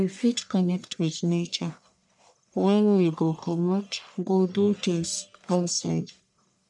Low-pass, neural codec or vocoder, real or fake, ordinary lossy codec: 10.8 kHz; codec, 44.1 kHz, 1.7 kbps, Pupu-Codec; fake; none